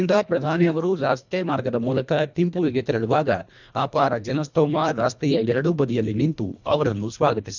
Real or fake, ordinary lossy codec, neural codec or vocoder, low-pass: fake; none; codec, 24 kHz, 1.5 kbps, HILCodec; 7.2 kHz